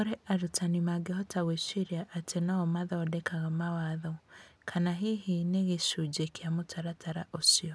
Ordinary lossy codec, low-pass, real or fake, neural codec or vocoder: none; none; real; none